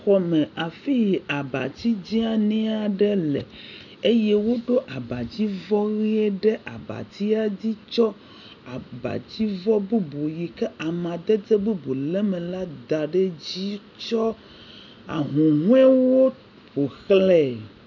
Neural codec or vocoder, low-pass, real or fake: none; 7.2 kHz; real